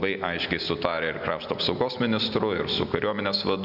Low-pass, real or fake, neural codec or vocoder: 5.4 kHz; real; none